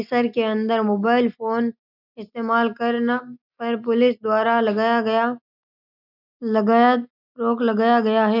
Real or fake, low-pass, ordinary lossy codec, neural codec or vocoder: real; 5.4 kHz; none; none